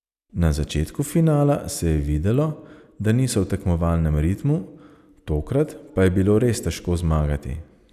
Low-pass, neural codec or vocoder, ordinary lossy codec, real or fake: 14.4 kHz; none; none; real